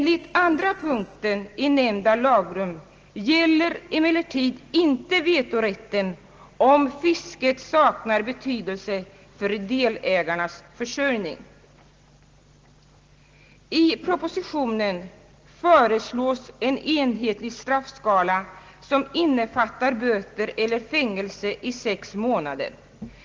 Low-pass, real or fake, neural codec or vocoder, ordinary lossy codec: 7.2 kHz; real; none; Opus, 16 kbps